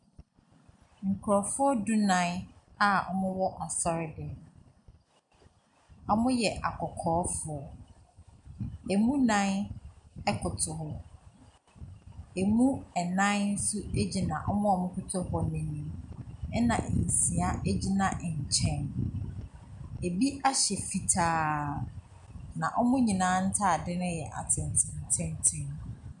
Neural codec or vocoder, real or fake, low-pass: none; real; 10.8 kHz